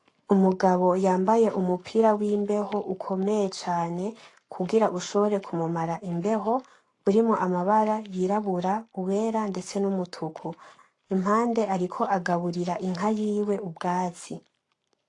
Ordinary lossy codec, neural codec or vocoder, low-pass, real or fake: AAC, 48 kbps; codec, 44.1 kHz, 7.8 kbps, Pupu-Codec; 10.8 kHz; fake